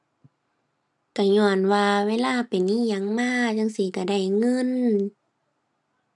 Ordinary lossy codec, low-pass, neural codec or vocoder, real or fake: none; none; none; real